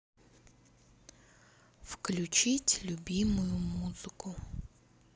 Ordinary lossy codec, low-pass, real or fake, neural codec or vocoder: none; none; real; none